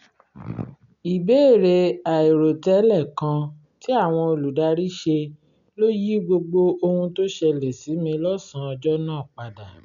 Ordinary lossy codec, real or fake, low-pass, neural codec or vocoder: none; real; 7.2 kHz; none